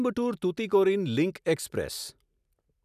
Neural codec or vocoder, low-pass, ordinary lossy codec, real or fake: none; 14.4 kHz; none; real